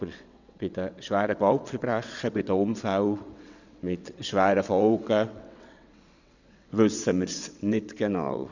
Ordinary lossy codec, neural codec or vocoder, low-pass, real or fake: none; vocoder, 22.05 kHz, 80 mel bands, WaveNeXt; 7.2 kHz; fake